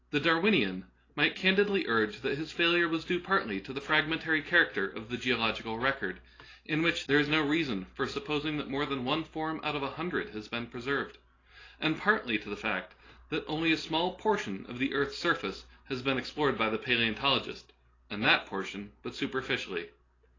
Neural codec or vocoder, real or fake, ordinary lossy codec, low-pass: none; real; AAC, 32 kbps; 7.2 kHz